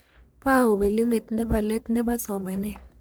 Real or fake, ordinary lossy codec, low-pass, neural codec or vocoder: fake; none; none; codec, 44.1 kHz, 1.7 kbps, Pupu-Codec